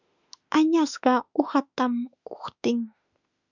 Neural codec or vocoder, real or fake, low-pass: autoencoder, 48 kHz, 32 numbers a frame, DAC-VAE, trained on Japanese speech; fake; 7.2 kHz